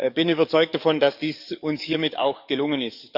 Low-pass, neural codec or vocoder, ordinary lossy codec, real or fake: 5.4 kHz; codec, 44.1 kHz, 7.8 kbps, DAC; none; fake